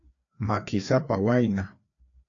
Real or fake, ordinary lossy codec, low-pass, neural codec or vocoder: fake; AAC, 48 kbps; 7.2 kHz; codec, 16 kHz, 2 kbps, FreqCodec, larger model